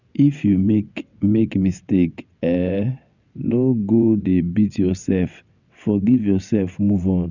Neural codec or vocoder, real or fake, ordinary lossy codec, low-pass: vocoder, 22.05 kHz, 80 mel bands, WaveNeXt; fake; none; 7.2 kHz